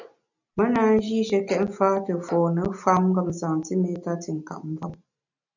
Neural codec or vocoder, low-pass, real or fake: none; 7.2 kHz; real